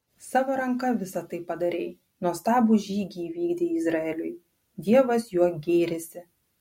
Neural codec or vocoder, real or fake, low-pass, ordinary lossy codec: none; real; 19.8 kHz; MP3, 64 kbps